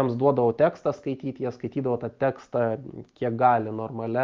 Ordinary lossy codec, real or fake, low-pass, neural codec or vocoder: Opus, 32 kbps; real; 7.2 kHz; none